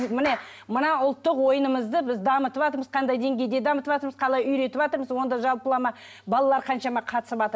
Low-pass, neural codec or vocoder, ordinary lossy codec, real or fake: none; none; none; real